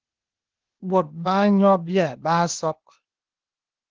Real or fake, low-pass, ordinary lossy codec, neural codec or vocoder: fake; 7.2 kHz; Opus, 16 kbps; codec, 16 kHz, 0.8 kbps, ZipCodec